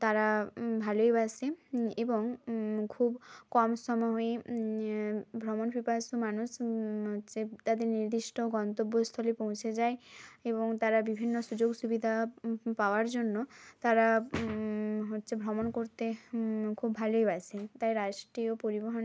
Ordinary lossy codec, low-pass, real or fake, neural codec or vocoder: none; none; real; none